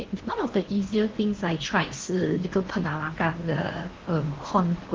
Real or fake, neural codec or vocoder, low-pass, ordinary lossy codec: fake; codec, 16 kHz in and 24 kHz out, 0.8 kbps, FocalCodec, streaming, 65536 codes; 7.2 kHz; Opus, 16 kbps